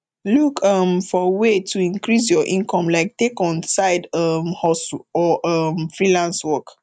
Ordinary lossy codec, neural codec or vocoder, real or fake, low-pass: none; none; real; 9.9 kHz